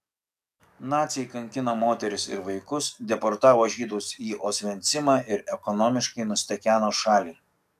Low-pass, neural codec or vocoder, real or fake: 14.4 kHz; codec, 44.1 kHz, 7.8 kbps, DAC; fake